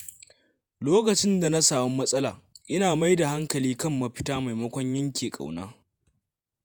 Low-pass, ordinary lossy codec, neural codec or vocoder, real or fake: none; none; vocoder, 48 kHz, 128 mel bands, Vocos; fake